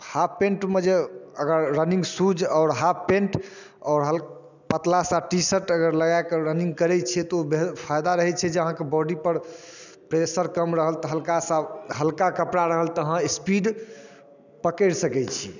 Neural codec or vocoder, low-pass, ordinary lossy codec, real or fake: none; 7.2 kHz; none; real